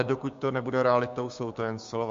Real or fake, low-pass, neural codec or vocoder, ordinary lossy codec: fake; 7.2 kHz; codec, 16 kHz, 6 kbps, DAC; MP3, 48 kbps